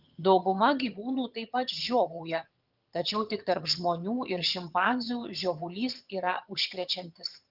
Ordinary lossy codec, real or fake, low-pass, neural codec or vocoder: Opus, 32 kbps; fake; 5.4 kHz; vocoder, 22.05 kHz, 80 mel bands, HiFi-GAN